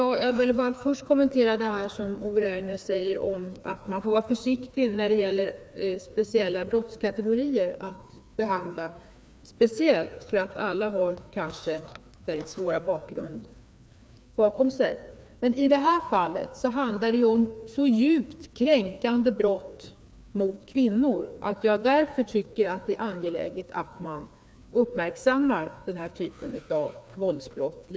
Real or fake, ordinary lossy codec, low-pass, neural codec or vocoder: fake; none; none; codec, 16 kHz, 2 kbps, FreqCodec, larger model